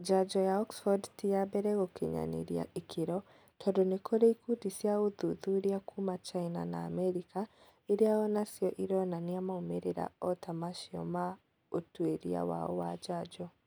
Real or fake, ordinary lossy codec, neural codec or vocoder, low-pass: real; none; none; none